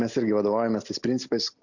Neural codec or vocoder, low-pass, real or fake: none; 7.2 kHz; real